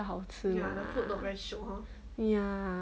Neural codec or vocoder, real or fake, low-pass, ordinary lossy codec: none; real; none; none